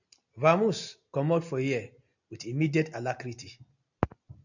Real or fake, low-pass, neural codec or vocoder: real; 7.2 kHz; none